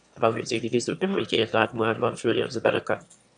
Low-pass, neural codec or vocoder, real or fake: 9.9 kHz; autoencoder, 22.05 kHz, a latent of 192 numbers a frame, VITS, trained on one speaker; fake